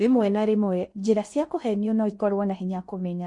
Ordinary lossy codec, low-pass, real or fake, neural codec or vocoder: MP3, 48 kbps; 10.8 kHz; fake; codec, 16 kHz in and 24 kHz out, 0.6 kbps, FocalCodec, streaming, 2048 codes